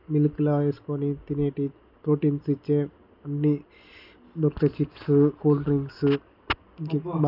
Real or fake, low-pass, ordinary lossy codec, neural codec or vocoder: real; 5.4 kHz; none; none